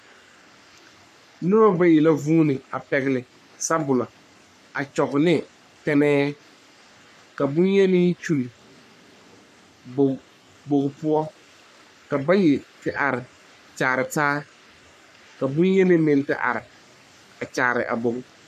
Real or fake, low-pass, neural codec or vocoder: fake; 14.4 kHz; codec, 44.1 kHz, 3.4 kbps, Pupu-Codec